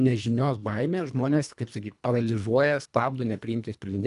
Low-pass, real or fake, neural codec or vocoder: 10.8 kHz; fake; codec, 24 kHz, 1.5 kbps, HILCodec